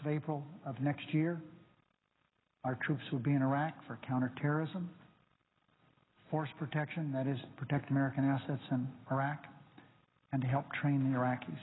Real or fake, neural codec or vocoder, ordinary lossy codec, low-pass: real; none; AAC, 16 kbps; 7.2 kHz